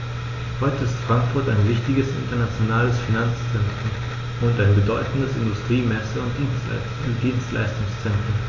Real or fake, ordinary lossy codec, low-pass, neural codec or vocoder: real; AAC, 32 kbps; 7.2 kHz; none